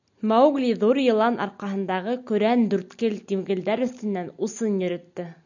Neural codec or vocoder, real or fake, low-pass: none; real; 7.2 kHz